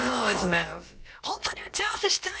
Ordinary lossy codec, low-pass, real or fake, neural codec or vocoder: none; none; fake; codec, 16 kHz, about 1 kbps, DyCAST, with the encoder's durations